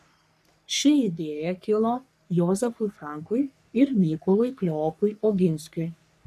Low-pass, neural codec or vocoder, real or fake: 14.4 kHz; codec, 44.1 kHz, 3.4 kbps, Pupu-Codec; fake